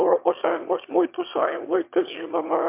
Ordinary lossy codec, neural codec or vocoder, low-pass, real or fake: MP3, 32 kbps; autoencoder, 22.05 kHz, a latent of 192 numbers a frame, VITS, trained on one speaker; 3.6 kHz; fake